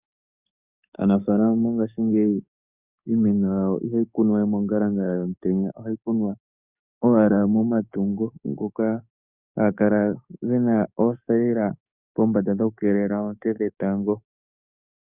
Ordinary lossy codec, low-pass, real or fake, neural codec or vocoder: Opus, 64 kbps; 3.6 kHz; fake; codec, 44.1 kHz, 7.8 kbps, DAC